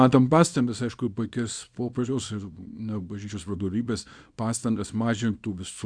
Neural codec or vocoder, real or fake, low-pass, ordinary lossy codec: codec, 24 kHz, 0.9 kbps, WavTokenizer, small release; fake; 9.9 kHz; Opus, 64 kbps